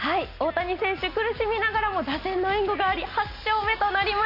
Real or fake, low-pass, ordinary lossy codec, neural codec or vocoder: real; 5.4 kHz; none; none